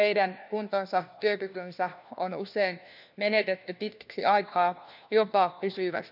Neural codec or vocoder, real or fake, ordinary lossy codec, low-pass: codec, 16 kHz, 1 kbps, FunCodec, trained on LibriTTS, 50 frames a second; fake; none; 5.4 kHz